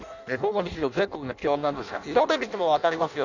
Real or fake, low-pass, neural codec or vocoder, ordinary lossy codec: fake; 7.2 kHz; codec, 16 kHz in and 24 kHz out, 0.6 kbps, FireRedTTS-2 codec; none